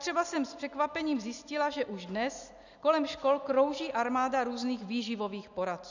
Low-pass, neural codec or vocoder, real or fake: 7.2 kHz; none; real